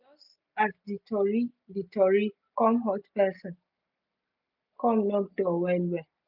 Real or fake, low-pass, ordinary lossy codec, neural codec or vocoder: real; 5.4 kHz; none; none